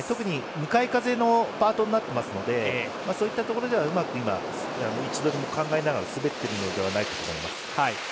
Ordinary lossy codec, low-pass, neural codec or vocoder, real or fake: none; none; none; real